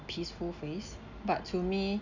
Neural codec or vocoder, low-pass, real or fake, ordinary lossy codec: none; 7.2 kHz; real; none